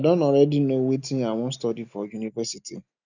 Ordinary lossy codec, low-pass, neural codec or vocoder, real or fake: none; 7.2 kHz; none; real